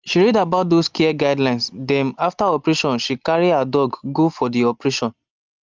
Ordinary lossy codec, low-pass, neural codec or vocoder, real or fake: Opus, 32 kbps; 7.2 kHz; none; real